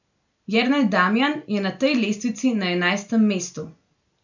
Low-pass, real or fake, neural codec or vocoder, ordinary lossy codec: 7.2 kHz; real; none; none